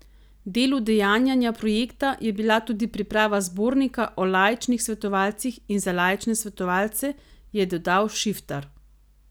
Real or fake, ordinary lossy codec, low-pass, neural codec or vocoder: real; none; none; none